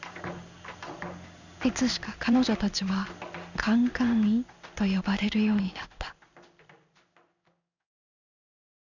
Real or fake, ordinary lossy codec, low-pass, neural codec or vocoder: fake; none; 7.2 kHz; codec, 16 kHz in and 24 kHz out, 1 kbps, XY-Tokenizer